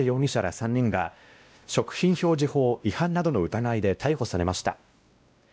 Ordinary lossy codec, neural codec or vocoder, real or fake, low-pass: none; codec, 16 kHz, 1 kbps, X-Codec, WavLM features, trained on Multilingual LibriSpeech; fake; none